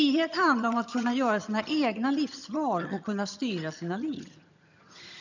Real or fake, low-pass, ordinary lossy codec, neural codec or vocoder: fake; 7.2 kHz; none; vocoder, 22.05 kHz, 80 mel bands, HiFi-GAN